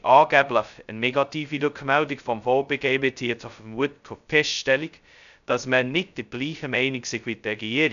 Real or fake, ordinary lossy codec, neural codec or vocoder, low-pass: fake; none; codec, 16 kHz, 0.2 kbps, FocalCodec; 7.2 kHz